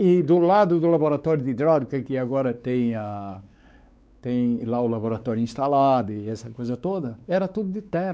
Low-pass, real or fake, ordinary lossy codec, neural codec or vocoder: none; fake; none; codec, 16 kHz, 4 kbps, X-Codec, WavLM features, trained on Multilingual LibriSpeech